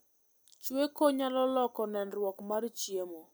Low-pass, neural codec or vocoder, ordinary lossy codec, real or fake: none; none; none; real